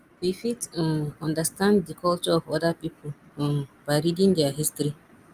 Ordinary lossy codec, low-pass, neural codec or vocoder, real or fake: Opus, 32 kbps; 14.4 kHz; none; real